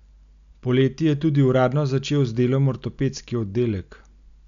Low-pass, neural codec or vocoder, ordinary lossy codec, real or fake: 7.2 kHz; none; none; real